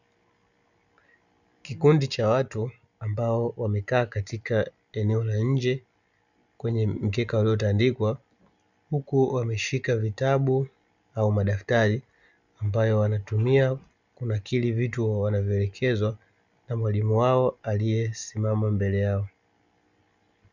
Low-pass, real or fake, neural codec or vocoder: 7.2 kHz; real; none